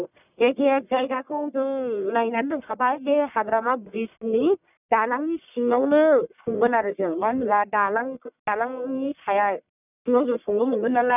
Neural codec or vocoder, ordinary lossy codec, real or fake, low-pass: codec, 44.1 kHz, 1.7 kbps, Pupu-Codec; none; fake; 3.6 kHz